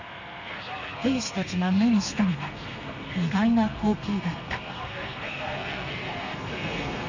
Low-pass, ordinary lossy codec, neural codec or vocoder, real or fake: 7.2 kHz; none; codec, 32 kHz, 1.9 kbps, SNAC; fake